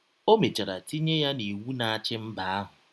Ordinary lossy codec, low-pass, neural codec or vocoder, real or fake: none; none; none; real